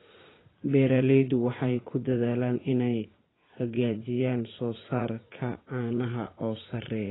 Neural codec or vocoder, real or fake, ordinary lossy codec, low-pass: vocoder, 44.1 kHz, 80 mel bands, Vocos; fake; AAC, 16 kbps; 7.2 kHz